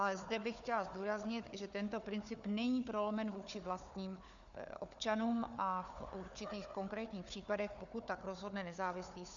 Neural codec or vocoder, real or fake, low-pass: codec, 16 kHz, 4 kbps, FunCodec, trained on Chinese and English, 50 frames a second; fake; 7.2 kHz